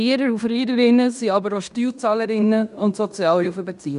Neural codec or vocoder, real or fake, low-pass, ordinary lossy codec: codec, 16 kHz in and 24 kHz out, 0.9 kbps, LongCat-Audio-Codec, fine tuned four codebook decoder; fake; 10.8 kHz; none